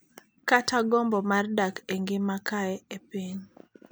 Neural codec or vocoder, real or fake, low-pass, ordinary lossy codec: none; real; none; none